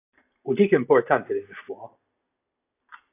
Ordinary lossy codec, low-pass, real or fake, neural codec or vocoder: AAC, 16 kbps; 3.6 kHz; real; none